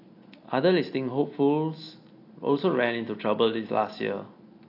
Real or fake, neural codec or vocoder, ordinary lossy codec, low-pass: real; none; AAC, 32 kbps; 5.4 kHz